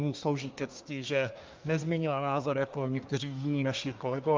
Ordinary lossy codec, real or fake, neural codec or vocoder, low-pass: Opus, 24 kbps; fake; codec, 24 kHz, 1 kbps, SNAC; 7.2 kHz